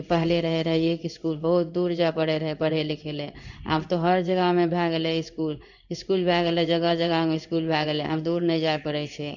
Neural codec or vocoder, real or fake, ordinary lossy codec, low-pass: codec, 16 kHz in and 24 kHz out, 1 kbps, XY-Tokenizer; fake; none; 7.2 kHz